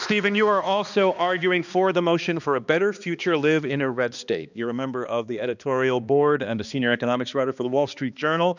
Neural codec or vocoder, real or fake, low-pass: codec, 16 kHz, 2 kbps, X-Codec, HuBERT features, trained on balanced general audio; fake; 7.2 kHz